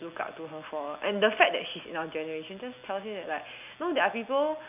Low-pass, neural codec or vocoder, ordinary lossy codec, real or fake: 3.6 kHz; none; none; real